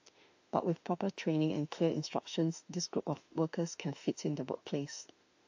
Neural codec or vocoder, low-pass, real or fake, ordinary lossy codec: autoencoder, 48 kHz, 32 numbers a frame, DAC-VAE, trained on Japanese speech; 7.2 kHz; fake; AAC, 48 kbps